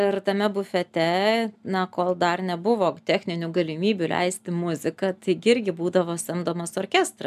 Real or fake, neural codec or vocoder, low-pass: real; none; 14.4 kHz